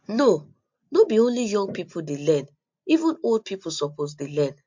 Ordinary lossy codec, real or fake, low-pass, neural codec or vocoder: MP3, 48 kbps; real; 7.2 kHz; none